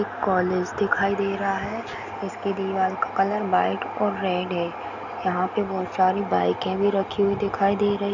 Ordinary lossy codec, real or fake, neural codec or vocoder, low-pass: none; real; none; 7.2 kHz